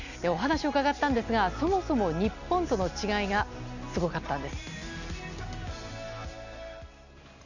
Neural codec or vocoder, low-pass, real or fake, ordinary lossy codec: none; 7.2 kHz; real; none